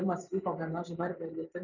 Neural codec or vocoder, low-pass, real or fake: vocoder, 44.1 kHz, 128 mel bands every 512 samples, BigVGAN v2; 7.2 kHz; fake